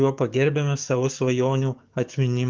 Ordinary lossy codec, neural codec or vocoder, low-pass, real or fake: Opus, 32 kbps; codec, 44.1 kHz, 7.8 kbps, DAC; 7.2 kHz; fake